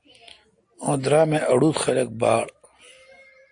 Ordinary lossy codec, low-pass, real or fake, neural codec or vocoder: AAC, 48 kbps; 9.9 kHz; real; none